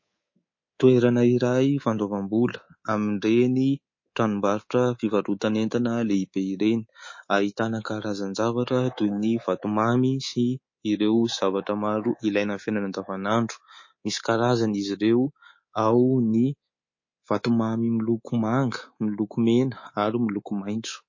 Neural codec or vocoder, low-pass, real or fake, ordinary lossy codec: codec, 24 kHz, 3.1 kbps, DualCodec; 7.2 kHz; fake; MP3, 32 kbps